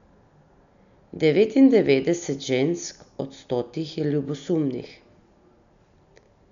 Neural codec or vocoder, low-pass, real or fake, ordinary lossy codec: none; 7.2 kHz; real; none